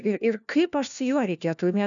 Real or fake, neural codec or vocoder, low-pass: fake; codec, 16 kHz, 1 kbps, FunCodec, trained on LibriTTS, 50 frames a second; 7.2 kHz